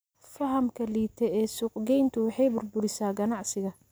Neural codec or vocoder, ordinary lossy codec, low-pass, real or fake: none; none; none; real